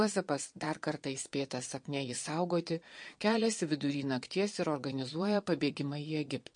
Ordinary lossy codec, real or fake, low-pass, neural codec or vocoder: MP3, 48 kbps; fake; 9.9 kHz; vocoder, 22.05 kHz, 80 mel bands, Vocos